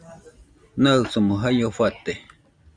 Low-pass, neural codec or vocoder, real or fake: 9.9 kHz; none; real